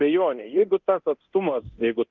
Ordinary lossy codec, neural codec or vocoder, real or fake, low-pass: Opus, 24 kbps; codec, 24 kHz, 0.9 kbps, DualCodec; fake; 7.2 kHz